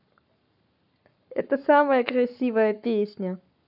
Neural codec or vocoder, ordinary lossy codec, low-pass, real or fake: codec, 44.1 kHz, 7.8 kbps, Pupu-Codec; none; 5.4 kHz; fake